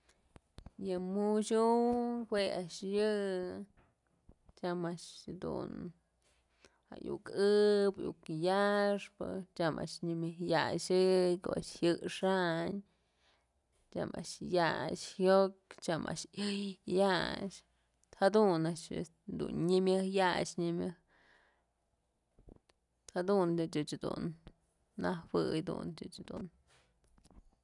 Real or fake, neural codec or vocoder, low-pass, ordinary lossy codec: real; none; 10.8 kHz; none